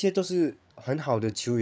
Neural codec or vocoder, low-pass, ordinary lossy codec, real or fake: codec, 16 kHz, 8 kbps, FreqCodec, larger model; none; none; fake